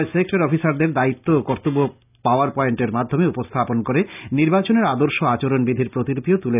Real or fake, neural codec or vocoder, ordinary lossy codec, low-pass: real; none; none; 3.6 kHz